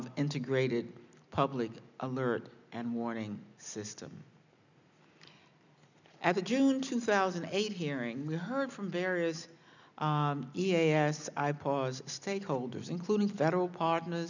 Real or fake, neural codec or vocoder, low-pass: real; none; 7.2 kHz